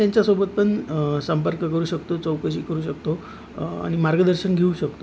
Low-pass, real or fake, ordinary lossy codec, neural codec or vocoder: none; real; none; none